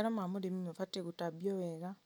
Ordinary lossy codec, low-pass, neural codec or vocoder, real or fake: none; none; none; real